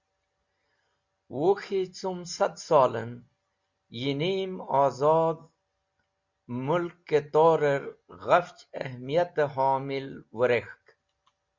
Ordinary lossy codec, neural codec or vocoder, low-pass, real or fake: Opus, 64 kbps; none; 7.2 kHz; real